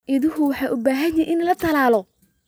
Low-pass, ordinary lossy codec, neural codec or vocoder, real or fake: none; none; none; real